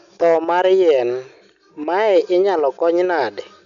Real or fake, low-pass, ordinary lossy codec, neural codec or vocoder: real; 7.2 kHz; none; none